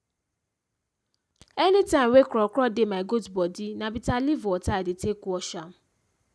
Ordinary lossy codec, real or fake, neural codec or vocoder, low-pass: none; real; none; none